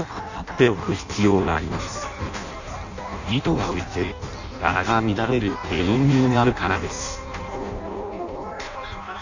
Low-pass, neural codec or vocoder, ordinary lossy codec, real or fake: 7.2 kHz; codec, 16 kHz in and 24 kHz out, 0.6 kbps, FireRedTTS-2 codec; none; fake